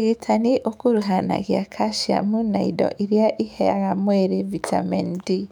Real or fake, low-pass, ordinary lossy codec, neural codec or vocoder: fake; 19.8 kHz; none; autoencoder, 48 kHz, 128 numbers a frame, DAC-VAE, trained on Japanese speech